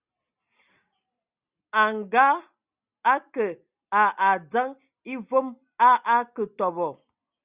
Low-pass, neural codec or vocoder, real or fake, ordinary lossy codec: 3.6 kHz; none; real; Opus, 64 kbps